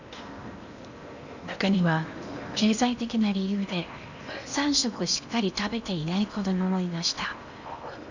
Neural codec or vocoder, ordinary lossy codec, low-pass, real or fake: codec, 16 kHz in and 24 kHz out, 0.8 kbps, FocalCodec, streaming, 65536 codes; none; 7.2 kHz; fake